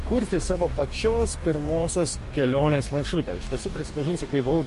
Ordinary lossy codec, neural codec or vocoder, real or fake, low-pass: MP3, 48 kbps; codec, 44.1 kHz, 2.6 kbps, DAC; fake; 14.4 kHz